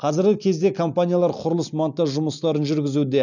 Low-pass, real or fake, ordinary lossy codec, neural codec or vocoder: 7.2 kHz; real; none; none